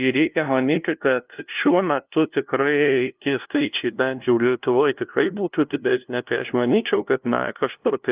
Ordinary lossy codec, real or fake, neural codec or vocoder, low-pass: Opus, 32 kbps; fake; codec, 16 kHz, 0.5 kbps, FunCodec, trained on LibriTTS, 25 frames a second; 3.6 kHz